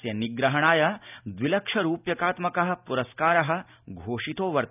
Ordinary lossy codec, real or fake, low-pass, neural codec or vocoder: none; real; 3.6 kHz; none